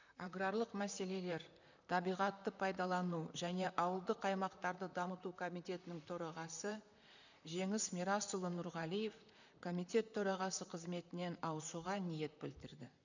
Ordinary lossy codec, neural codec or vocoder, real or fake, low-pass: none; vocoder, 44.1 kHz, 128 mel bands, Pupu-Vocoder; fake; 7.2 kHz